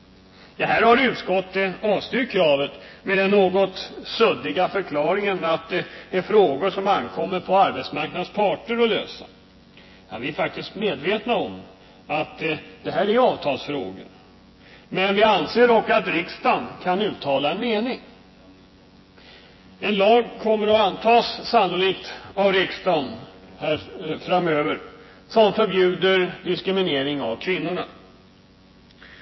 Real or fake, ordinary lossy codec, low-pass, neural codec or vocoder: fake; MP3, 24 kbps; 7.2 kHz; vocoder, 24 kHz, 100 mel bands, Vocos